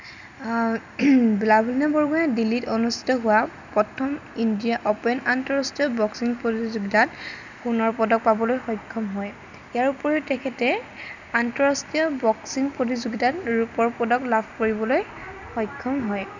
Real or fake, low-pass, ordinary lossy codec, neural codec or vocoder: real; 7.2 kHz; none; none